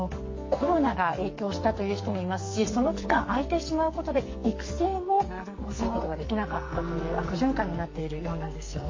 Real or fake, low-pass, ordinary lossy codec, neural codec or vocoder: fake; 7.2 kHz; MP3, 32 kbps; codec, 44.1 kHz, 2.6 kbps, SNAC